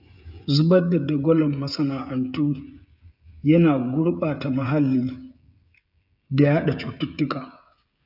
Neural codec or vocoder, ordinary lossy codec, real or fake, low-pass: codec, 16 kHz, 16 kbps, FreqCodec, smaller model; none; fake; 5.4 kHz